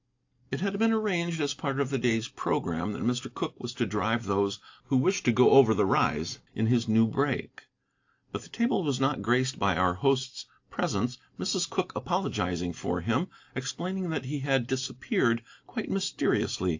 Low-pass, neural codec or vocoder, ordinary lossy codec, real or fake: 7.2 kHz; none; AAC, 48 kbps; real